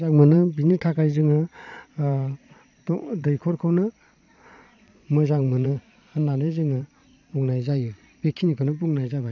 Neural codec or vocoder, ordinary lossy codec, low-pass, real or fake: vocoder, 22.05 kHz, 80 mel bands, Vocos; none; 7.2 kHz; fake